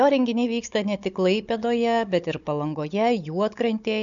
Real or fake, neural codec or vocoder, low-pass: fake; codec, 16 kHz, 16 kbps, FunCodec, trained on Chinese and English, 50 frames a second; 7.2 kHz